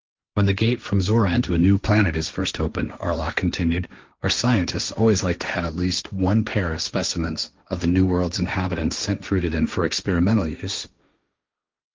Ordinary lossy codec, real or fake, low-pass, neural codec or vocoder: Opus, 24 kbps; fake; 7.2 kHz; codec, 16 kHz, 1.1 kbps, Voila-Tokenizer